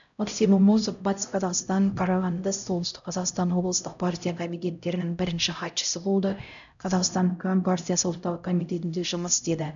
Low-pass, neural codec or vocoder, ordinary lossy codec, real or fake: 7.2 kHz; codec, 16 kHz, 0.5 kbps, X-Codec, HuBERT features, trained on LibriSpeech; none; fake